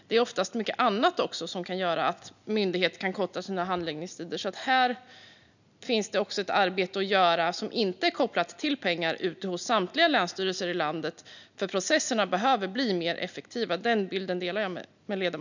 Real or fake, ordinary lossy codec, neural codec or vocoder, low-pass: real; none; none; 7.2 kHz